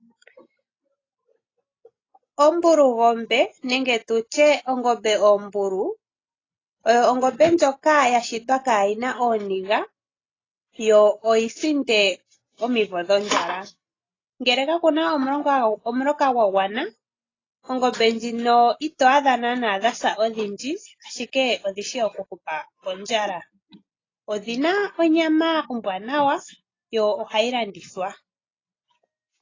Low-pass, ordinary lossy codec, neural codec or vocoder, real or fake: 7.2 kHz; AAC, 32 kbps; none; real